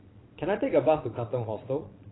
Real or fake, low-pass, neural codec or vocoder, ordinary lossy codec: fake; 7.2 kHz; vocoder, 22.05 kHz, 80 mel bands, Vocos; AAC, 16 kbps